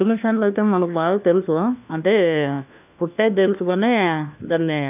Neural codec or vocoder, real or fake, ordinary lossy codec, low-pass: codec, 16 kHz, 1 kbps, FunCodec, trained on LibriTTS, 50 frames a second; fake; none; 3.6 kHz